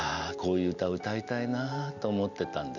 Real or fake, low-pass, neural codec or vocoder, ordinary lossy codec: real; 7.2 kHz; none; none